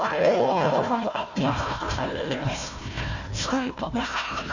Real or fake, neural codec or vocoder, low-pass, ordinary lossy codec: fake; codec, 16 kHz, 1 kbps, FunCodec, trained on Chinese and English, 50 frames a second; 7.2 kHz; none